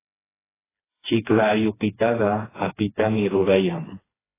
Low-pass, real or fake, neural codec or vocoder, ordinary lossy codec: 3.6 kHz; fake; codec, 16 kHz, 2 kbps, FreqCodec, smaller model; AAC, 16 kbps